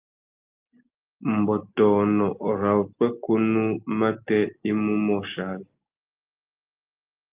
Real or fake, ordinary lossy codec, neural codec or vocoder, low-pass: real; Opus, 24 kbps; none; 3.6 kHz